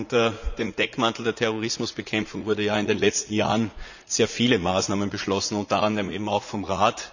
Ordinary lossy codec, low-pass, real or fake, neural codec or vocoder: none; 7.2 kHz; fake; vocoder, 44.1 kHz, 80 mel bands, Vocos